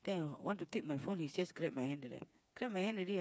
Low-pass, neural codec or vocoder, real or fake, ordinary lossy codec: none; codec, 16 kHz, 4 kbps, FreqCodec, smaller model; fake; none